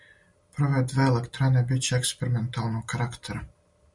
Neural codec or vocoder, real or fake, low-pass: none; real; 10.8 kHz